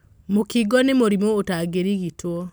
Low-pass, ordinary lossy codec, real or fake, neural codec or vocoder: none; none; real; none